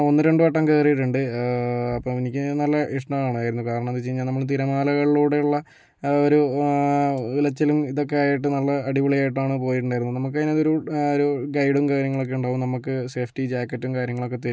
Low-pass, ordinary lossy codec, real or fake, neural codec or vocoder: none; none; real; none